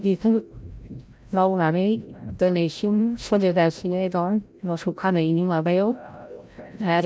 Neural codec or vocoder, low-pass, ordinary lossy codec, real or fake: codec, 16 kHz, 0.5 kbps, FreqCodec, larger model; none; none; fake